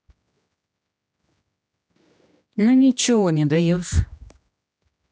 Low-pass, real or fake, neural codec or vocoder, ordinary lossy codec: none; fake; codec, 16 kHz, 1 kbps, X-Codec, HuBERT features, trained on general audio; none